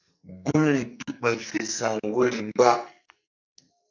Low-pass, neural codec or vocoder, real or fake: 7.2 kHz; codec, 44.1 kHz, 2.6 kbps, SNAC; fake